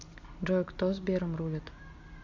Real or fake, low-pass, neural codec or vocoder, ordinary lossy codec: real; 7.2 kHz; none; MP3, 64 kbps